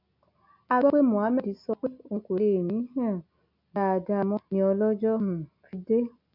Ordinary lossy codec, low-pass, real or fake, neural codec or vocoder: none; 5.4 kHz; real; none